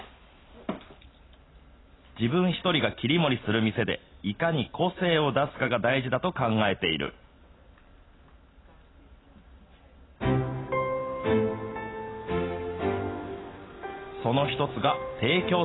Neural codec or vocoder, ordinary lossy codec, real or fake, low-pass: none; AAC, 16 kbps; real; 7.2 kHz